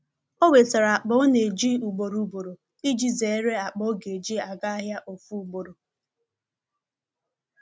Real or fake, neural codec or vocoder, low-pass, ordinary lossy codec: real; none; none; none